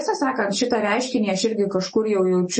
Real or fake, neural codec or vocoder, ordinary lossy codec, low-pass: real; none; MP3, 32 kbps; 10.8 kHz